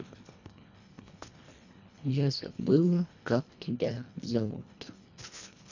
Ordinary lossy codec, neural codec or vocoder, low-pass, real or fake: none; codec, 24 kHz, 1.5 kbps, HILCodec; 7.2 kHz; fake